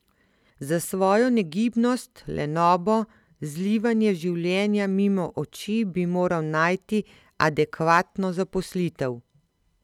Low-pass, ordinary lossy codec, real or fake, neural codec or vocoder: 19.8 kHz; none; real; none